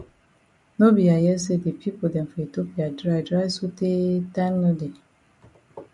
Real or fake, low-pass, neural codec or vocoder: real; 10.8 kHz; none